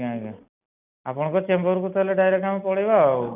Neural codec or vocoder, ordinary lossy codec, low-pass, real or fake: none; none; 3.6 kHz; real